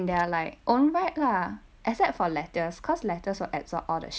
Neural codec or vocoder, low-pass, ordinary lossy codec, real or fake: none; none; none; real